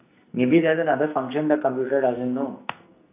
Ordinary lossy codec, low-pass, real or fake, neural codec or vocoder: AAC, 24 kbps; 3.6 kHz; fake; codec, 44.1 kHz, 2.6 kbps, SNAC